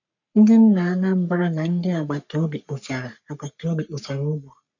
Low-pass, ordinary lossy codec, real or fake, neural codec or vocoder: 7.2 kHz; none; fake; codec, 44.1 kHz, 3.4 kbps, Pupu-Codec